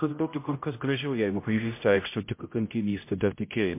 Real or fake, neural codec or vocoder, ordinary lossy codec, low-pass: fake; codec, 16 kHz, 0.5 kbps, X-Codec, HuBERT features, trained on general audio; MP3, 24 kbps; 3.6 kHz